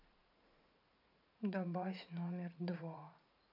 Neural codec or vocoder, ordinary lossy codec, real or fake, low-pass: vocoder, 44.1 kHz, 80 mel bands, Vocos; none; fake; 5.4 kHz